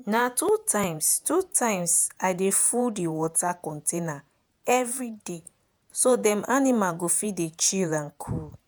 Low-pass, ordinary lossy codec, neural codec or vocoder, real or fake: none; none; vocoder, 48 kHz, 128 mel bands, Vocos; fake